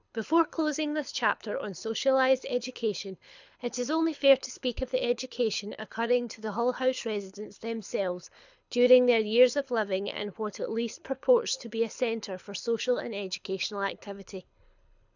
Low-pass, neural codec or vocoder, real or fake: 7.2 kHz; codec, 24 kHz, 6 kbps, HILCodec; fake